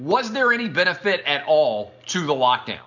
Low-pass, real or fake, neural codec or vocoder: 7.2 kHz; real; none